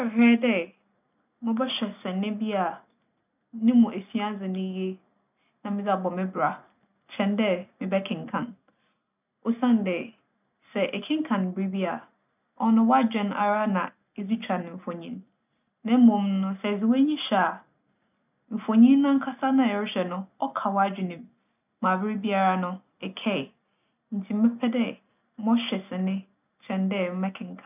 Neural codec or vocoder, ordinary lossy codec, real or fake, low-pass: none; none; real; 3.6 kHz